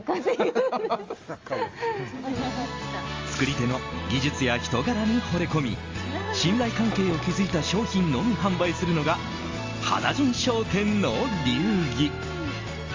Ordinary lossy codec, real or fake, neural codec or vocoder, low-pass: Opus, 32 kbps; real; none; 7.2 kHz